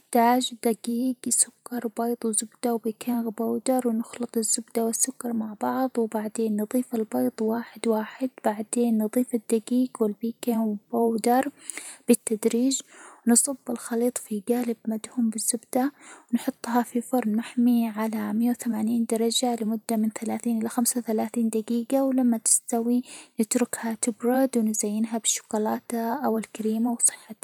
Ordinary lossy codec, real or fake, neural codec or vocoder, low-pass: none; fake; vocoder, 44.1 kHz, 128 mel bands every 512 samples, BigVGAN v2; none